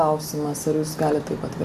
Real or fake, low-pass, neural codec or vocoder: real; 14.4 kHz; none